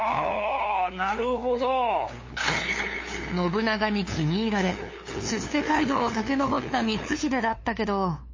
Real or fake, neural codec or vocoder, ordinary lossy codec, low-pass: fake; codec, 16 kHz, 2 kbps, FunCodec, trained on LibriTTS, 25 frames a second; MP3, 32 kbps; 7.2 kHz